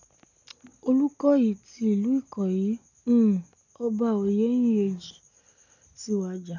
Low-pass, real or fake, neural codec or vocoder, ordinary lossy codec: 7.2 kHz; real; none; none